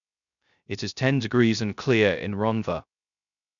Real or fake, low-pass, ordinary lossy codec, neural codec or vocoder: fake; 7.2 kHz; none; codec, 16 kHz, 0.3 kbps, FocalCodec